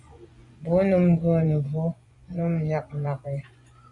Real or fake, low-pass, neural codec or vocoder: fake; 10.8 kHz; vocoder, 24 kHz, 100 mel bands, Vocos